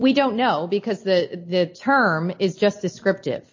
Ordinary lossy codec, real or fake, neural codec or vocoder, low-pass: MP3, 32 kbps; real; none; 7.2 kHz